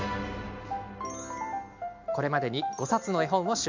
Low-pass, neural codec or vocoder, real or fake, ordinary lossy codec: 7.2 kHz; none; real; MP3, 64 kbps